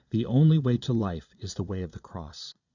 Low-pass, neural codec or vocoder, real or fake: 7.2 kHz; vocoder, 22.05 kHz, 80 mel bands, Vocos; fake